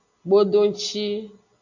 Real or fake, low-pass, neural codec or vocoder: real; 7.2 kHz; none